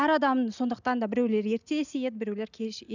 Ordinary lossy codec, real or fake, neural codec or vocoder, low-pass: none; real; none; 7.2 kHz